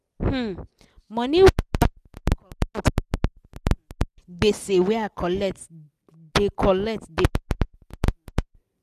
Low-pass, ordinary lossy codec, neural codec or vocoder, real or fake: 14.4 kHz; none; none; real